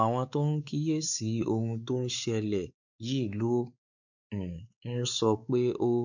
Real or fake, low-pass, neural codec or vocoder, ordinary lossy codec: fake; 7.2 kHz; codec, 16 kHz, 4 kbps, X-Codec, WavLM features, trained on Multilingual LibriSpeech; none